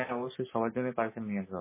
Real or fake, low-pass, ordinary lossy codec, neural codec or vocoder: real; 3.6 kHz; MP3, 16 kbps; none